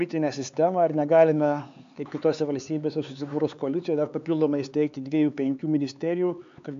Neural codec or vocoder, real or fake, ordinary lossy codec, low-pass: codec, 16 kHz, 4 kbps, X-Codec, HuBERT features, trained on LibriSpeech; fake; AAC, 64 kbps; 7.2 kHz